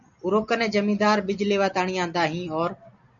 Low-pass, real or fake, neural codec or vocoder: 7.2 kHz; real; none